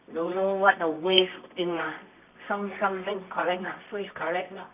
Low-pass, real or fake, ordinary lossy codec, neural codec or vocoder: 3.6 kHz; fake; none; codec, 24 kHz, 0.9 kbps, WavTokenizer, medium music audio release